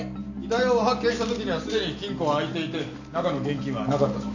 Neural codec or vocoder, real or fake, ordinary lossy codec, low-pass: none; real; none; 7.2 kHz